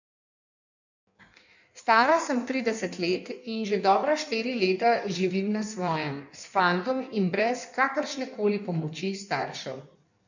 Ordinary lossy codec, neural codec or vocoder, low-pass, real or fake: none; codec, 16 kHz in and 24 kHz out, 1.1 kbps, FireRedTTS-2 codec; 7.2 kHz; fake